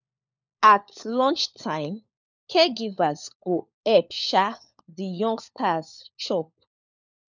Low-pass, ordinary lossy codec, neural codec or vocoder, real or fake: 7.2 kHz; none; codec, 16 kHz, 4 kbps, FunCodec, trained on LibriTTS, 50 frames a second; fake